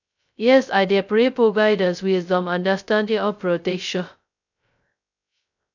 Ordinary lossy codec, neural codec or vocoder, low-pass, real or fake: none; codec, 16 kHz, 0.2 kbps, FocalCodec; 7.2 kHz; fake